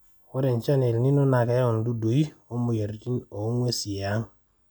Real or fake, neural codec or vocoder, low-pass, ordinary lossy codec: real; none; 19.8 kHz; none